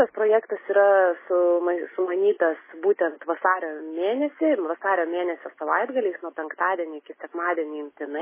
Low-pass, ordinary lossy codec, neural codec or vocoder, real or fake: 3.6 kHz; MP3, 16 kbps; none; real